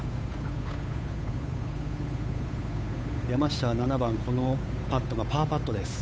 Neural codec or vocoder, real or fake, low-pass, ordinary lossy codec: codec, 16 kHz, 8 kbps, FunCodec, trained on Chinese and English, 25 frames a second; fake; none; none